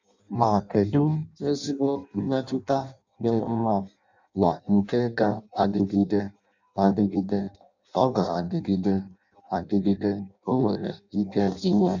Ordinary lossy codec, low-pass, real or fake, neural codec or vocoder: none; 7.2 kHz; fake; codec, 16 kHz in and 24 kHz out, 0.6 kbps, FireRedTTS-2 codec